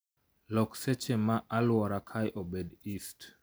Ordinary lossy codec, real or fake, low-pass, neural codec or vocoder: none; real; none; none